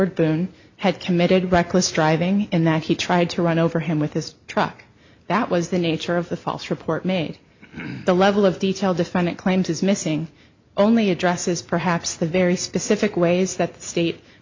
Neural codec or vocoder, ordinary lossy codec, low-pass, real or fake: none; MP3, 64 kbps; 7.2 kHz; real